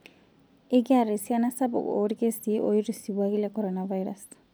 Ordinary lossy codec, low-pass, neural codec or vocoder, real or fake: none; none; none; real